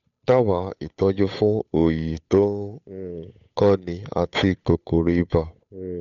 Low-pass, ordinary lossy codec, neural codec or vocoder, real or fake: 7.2 kHz; none; codec, 16 kHz, 8 kbps, FunCodec, trained on Chinese and English, 25 frames a second; fake